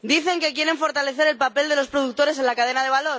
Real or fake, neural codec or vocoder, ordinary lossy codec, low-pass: real; none; none; none